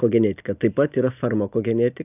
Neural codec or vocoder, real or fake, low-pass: none; real; 3.6 kHz